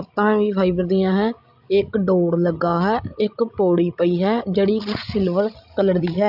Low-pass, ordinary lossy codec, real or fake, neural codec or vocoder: 5.4 kHz; AAC, 48 kbps; fake; codec, 16 kHz, 16 kbps, FreqCodec, larger model